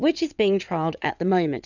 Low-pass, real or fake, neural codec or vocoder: 7.2 kHz; fake; vocoder, 22.05 kHz, 80 mel bands, WaveNeXt